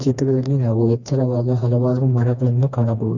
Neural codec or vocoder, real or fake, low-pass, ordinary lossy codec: codec, 16 kHz, 2 kbps, FreqCodec, smaller model; fake; 7.2 kHz; none